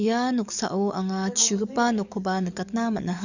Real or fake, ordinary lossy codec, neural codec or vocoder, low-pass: fake; none; codec, 44.1 kHz, 7.8 kbps, DAC; 7.2 kHz